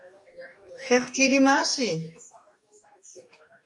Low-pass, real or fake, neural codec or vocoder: 10.8 kHz; fake; codec, 44.1 kHz, 2.6 kbps, DAC